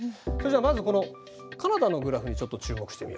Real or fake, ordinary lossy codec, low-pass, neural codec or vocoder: real; none; none; none